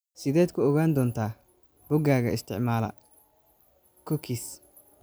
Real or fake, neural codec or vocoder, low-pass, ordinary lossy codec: real; none; none; none